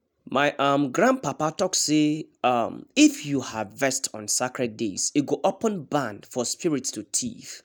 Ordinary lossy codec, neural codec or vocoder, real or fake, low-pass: none; none; real; none